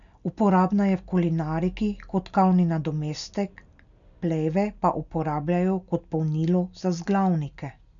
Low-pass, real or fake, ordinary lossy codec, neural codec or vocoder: 7.2 kHz; real; none; none